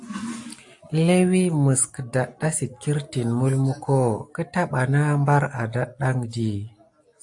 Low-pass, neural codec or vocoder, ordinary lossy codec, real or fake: 10.8 kHz; none; AAC, 64 kbps; real